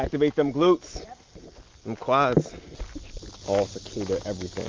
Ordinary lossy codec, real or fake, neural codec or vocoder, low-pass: Opus, 24 kbps; real; none; 7.2 kHz